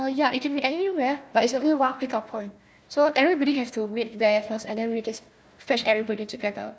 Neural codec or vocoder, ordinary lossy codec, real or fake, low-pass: codec, 16 kHz, 1 kbps, FunCodec, trained on Chinese and English, 50 frames a second; none; fake; none